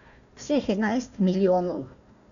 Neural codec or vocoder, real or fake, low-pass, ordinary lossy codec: codec, 16 kHz, 1 kbps, FunCodec, trained on Chinese and English, 50 frames a second; fake; 7.2 kHz; none